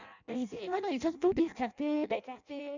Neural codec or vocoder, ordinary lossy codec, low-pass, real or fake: codec, 16 kHz in and 24 kHz out, 0.6 kbps, FireRedTTS-2 codec; none; 7.2 kHz; fake